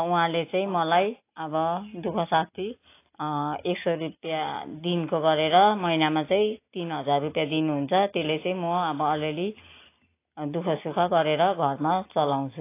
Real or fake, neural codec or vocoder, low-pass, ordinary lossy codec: real; none; 3.6 kHz; AAC, 24 kbps